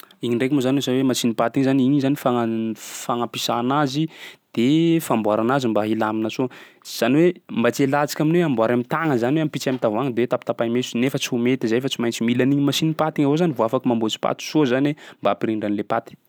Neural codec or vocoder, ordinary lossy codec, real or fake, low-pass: none; none; real; none